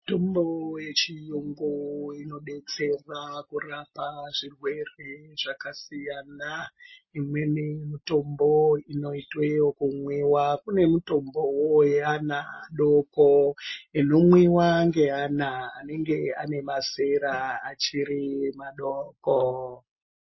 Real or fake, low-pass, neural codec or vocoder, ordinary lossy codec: real; 7.2 kHz; none; MP3, 24 kbps